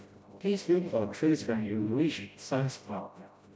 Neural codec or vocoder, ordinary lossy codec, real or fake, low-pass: codec, 16 kHz, 0.5 kbps, FreqCodec, smaller model; none; fake; none